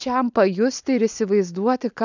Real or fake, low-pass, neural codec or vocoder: real; 7.2 kHz; none